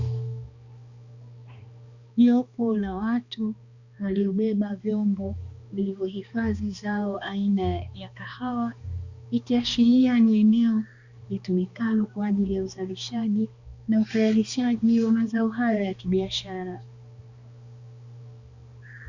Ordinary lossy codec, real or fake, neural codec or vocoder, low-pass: Opus, 64 kbps; fake; codec, 16 kHz, 2 kbps, X-Codec, HuBERT features, trained on balanced general audio; 7.2 kHz